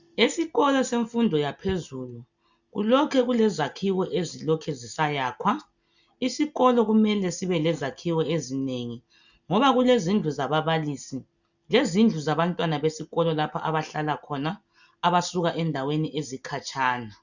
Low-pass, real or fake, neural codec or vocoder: 7.2 kHz; real; none